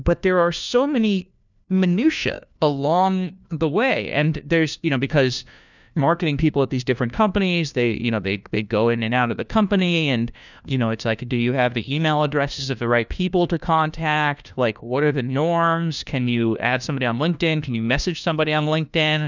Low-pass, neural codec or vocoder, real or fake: 7.2 kHz; codec, 16 kHz, 1 kbps, FunCodec, trained on LibriTTS, 50 frames a second; fake